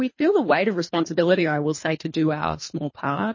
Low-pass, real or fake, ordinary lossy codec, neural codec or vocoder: 7.2 kHz; fake; MP3, 32 kbps; codec, 24 kHz, 3 kbps, HILCodec